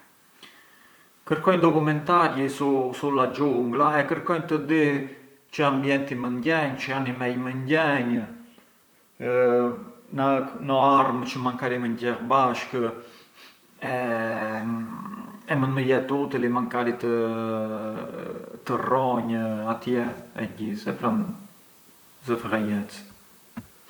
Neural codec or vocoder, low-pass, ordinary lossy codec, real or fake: vocoder, 44.1 kHz, 128 mel bands, Pupu-Vocoder; none; none; fake